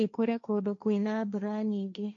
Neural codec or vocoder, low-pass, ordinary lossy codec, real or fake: codec, 16 kHz, 1.1 kbps, Voila-Tokenizer; 7.2 kHz; MP3, 64 kbps; fake